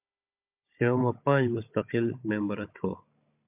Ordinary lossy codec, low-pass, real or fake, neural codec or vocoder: MP3, 32 kbps; 3.6 kHz; fake; codec, 16 kHz, 16 kbps, FunCodec, trained on Chinese and English, 50 frames a second